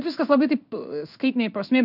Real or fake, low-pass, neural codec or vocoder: fake; 5.4 kHz; codec, 16 kHz in and 24 kHz out, 1 kbps, XY-Tokenizer